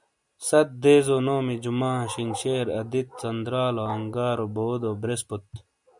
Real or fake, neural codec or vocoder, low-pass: real; none; 10.8 kHz